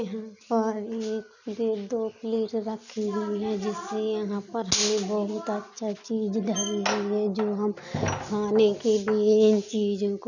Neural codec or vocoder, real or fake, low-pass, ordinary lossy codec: none; real; 7.2 kHz; none